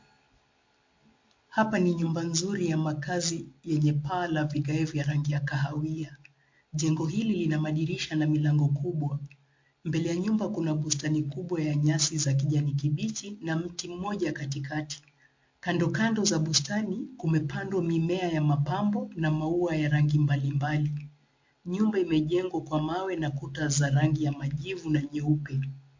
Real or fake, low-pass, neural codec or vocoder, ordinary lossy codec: real; 7.2 kHz; none; MP3, 48 kbps